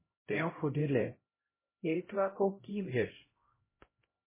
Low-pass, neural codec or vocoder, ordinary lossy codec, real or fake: 3.6 kHz; codec, 16 kHz, 0.5 kbps, X-Codec, HuBERT features, trained on LibriSpeech; MP3, 16 kbps; fake